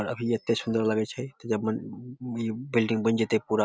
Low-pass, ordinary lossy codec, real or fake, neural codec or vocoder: none; none; real; none